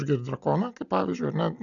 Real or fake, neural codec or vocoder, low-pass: real; none; 7.2 kHz